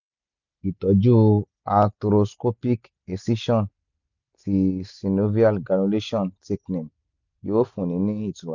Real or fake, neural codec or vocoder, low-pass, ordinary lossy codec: real; none; 7.2 kHz; none